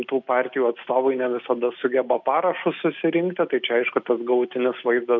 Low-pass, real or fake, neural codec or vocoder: 7.2 kHz; real; none